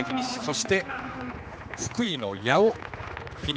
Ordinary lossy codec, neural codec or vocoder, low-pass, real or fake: none; codec, 16 kHz, 2 kbps, X-Codec, HuBERT features, trained on general audio; none; fake